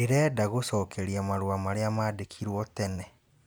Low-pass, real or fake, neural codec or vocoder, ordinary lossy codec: none; real; none; none